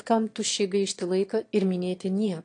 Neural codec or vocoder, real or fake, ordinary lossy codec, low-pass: autoencoder, 22.05 kHz, a latent of 192 numbers a frame, VITS, trained on one speaker; fake; AAC, 48 kbps; 9.9 kHz